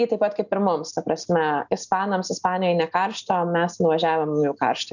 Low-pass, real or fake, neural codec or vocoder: 7.2 kHz; real; none